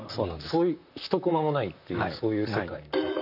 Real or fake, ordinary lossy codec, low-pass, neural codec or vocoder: fake; none; 5.4 kHz; vocoder, 44.1 kHz, 128 mel bands, Pupu-Vocoder